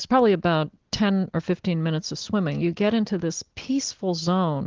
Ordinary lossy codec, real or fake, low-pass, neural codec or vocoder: Opus, 16 kbps; real; 7.2 kHz; none